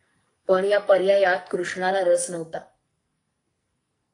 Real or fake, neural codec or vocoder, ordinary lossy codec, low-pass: fake; codec, 44.1 kHz, 2.6 kbps, SNAC; AAC, 48 kbps; 10.8 kHz